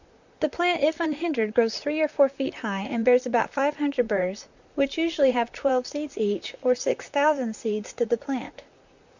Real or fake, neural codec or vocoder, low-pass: fake; vocoder, 44.1 kHz, 128 mel bands, Pupu-Vocoder; 7.2 kHz